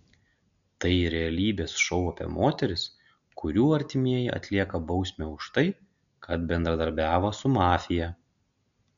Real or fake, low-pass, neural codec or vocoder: real; 7.2 kHz; none